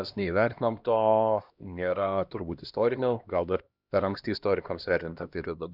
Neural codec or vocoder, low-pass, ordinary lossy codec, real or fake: codec, 16 kHz, 1 kbps, X-Codec, HuBERT features, trained on LibriSpeech; 5.4 kHz; Opus, 64 kbps; fake